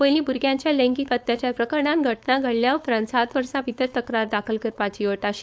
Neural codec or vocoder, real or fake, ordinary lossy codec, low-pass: codec, 16 kHz, 4.8 kbps, FACodec; fake; none; none